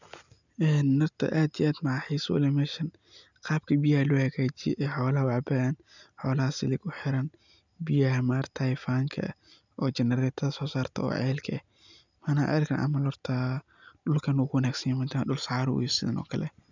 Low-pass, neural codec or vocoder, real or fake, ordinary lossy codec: 7.2 kHz; none; real; none